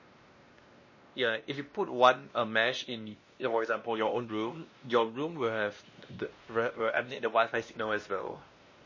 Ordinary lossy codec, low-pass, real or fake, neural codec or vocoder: MP3, 32 kbps; 7.2 kHz; fake; codec, 16 kHz, 1 kbps, X-Codec, WavLM features, trained on Multilingual LibriSpeech